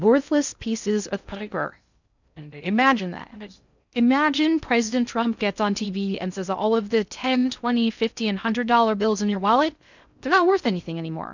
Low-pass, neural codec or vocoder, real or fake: 7.2 kHz; codec, 16 kHz in and 24 kHz out, 0.6 kbps, FocalCodec, streaming, 2048 codes; fake